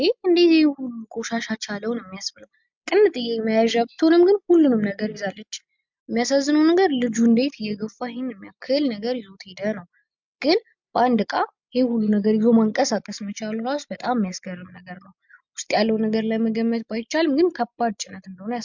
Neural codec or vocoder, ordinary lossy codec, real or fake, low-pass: none; MP3, 64 kbps; real; 7.2 kHz